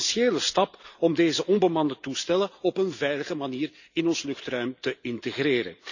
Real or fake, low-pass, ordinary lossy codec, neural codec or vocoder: real; 7.2 kHz; none; none